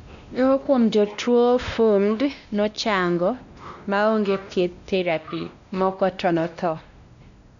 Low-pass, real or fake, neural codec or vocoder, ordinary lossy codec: 7.2 kHz; fake; codec, 16 kHz, 1 kbps, X-Codec, WavLM features, trained on Multilingual LibriSpeech; none